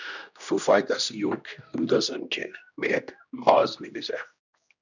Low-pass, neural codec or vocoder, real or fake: 7.2 kHz; codec, 16 kHz, 1 kbps, X-Codec, HuBERT features, trained on general audio; fake